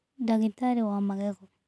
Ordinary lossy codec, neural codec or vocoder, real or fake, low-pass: none; none; real; none